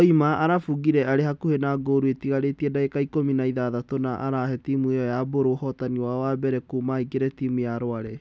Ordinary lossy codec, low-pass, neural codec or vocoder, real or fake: none; none; none; real